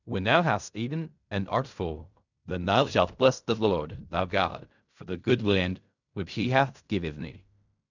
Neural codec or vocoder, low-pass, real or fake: codec, 16 kHz in and 24 kHz out, 0.4 kbps, LongCat-Audio-Codec, fine tuned four codebook decoder; 7.2 kHz; fake